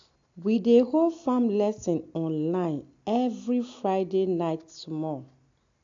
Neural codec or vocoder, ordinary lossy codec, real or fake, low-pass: none; AAC, 48 kbps; real; 7.2 kHz